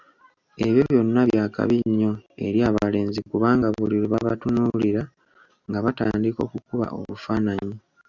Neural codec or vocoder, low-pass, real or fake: none; 7.2 kHz; real